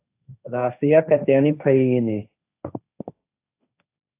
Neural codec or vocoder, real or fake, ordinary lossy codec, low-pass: codec, 16 kHz, 1.1 kbps, Voila-Tokenizer; fake; AAC, 24 kbps; 3.6 kHz